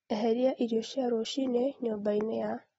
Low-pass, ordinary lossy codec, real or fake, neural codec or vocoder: 19.8 kHz; AAC, 24 kbps; real; none